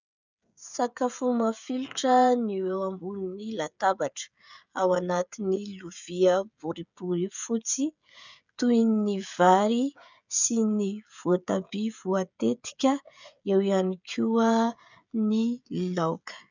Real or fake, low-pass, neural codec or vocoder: fake; 7.2 kHz; codec, 16 kHz, 16 kbps, FreqCodec, smaller model